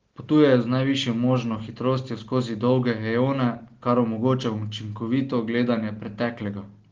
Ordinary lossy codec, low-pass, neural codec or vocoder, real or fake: Opus, 16 kbps; 7.2 kHz; none; real